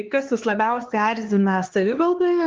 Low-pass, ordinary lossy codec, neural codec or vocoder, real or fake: 7.2 kHz; Opus, 16 kbps; codec, 16 kHz, 2 kbps, X-Codec, HuBERT features, trained on LibriSpeech; fake